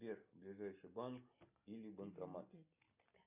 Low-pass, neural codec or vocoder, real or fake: 3.6 kHz; none; real